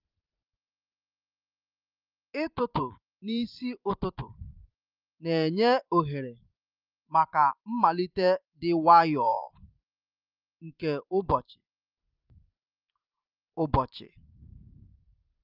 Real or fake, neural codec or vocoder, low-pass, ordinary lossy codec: fake; vocoder, 44.1 kHz, 128 mel bands every 512 samples, BigVGAN v2; 5.4 kHz; Opus, 24 kbps